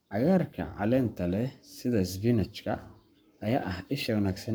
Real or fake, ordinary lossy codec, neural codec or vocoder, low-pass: fake; none; codec, 44.1 kHz, 7.8 kbps, Pupu-Codec; none